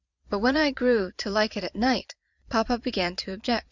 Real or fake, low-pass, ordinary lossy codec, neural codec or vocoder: fake; 7.2 kHz; Opus, 64 kbps; vocoder, 22.05 kHz, 80 mel bands, Vocos